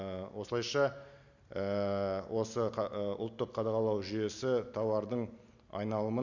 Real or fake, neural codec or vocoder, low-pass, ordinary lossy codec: real; none; 7.2 kHz; none